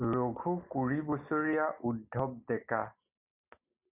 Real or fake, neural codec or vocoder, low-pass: real; none; 3.6 kHz